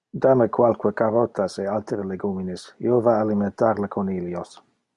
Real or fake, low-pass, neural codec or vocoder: real; 10.8 kHz; none